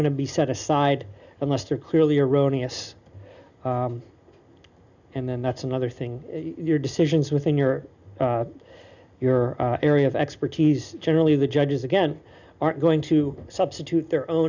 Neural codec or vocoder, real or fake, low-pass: none; real; 7.2 kHz